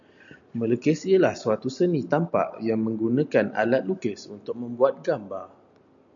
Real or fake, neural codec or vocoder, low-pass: real; none; 7.2 kHz